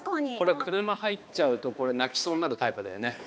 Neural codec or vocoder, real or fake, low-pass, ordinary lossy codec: codec, 16 kHz, 2 kbps, X-Codec, HuBERT features, trained on balanced general audio; fake; none; none